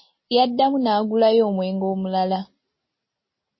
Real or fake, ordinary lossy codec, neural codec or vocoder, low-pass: real; MP3, 24 kbps; none; 7.2 kHz